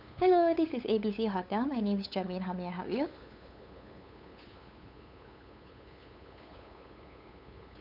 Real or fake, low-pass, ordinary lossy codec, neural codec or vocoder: fake; 5.4 kHz; none; codec, 16 kHz, 8 kbps, FunCodec, trained on LibriTTS, 25 frames a second